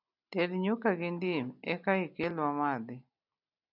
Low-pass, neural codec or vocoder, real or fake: 5.4 kHz; none; real